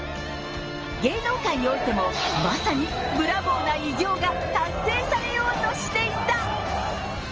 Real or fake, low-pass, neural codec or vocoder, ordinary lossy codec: real; 7.2 kHz; none; Opus, 24 kbps